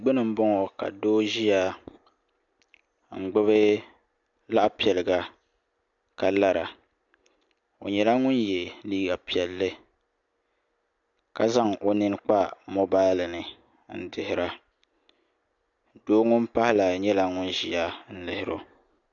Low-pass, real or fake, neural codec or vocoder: 7.2 kHz; real; none